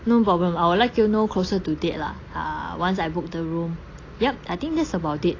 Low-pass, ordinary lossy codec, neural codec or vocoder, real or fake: 7.2 kHz; AAC, 32 kbps; none; real